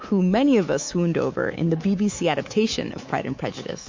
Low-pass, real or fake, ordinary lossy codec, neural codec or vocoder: 7.2 kHz; fake; MP3, 48 kbps; codec, 24 kHz, 3.1 kbps, DualCodec